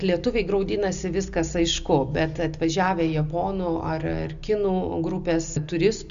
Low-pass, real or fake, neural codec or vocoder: 7.2 kHz; real; none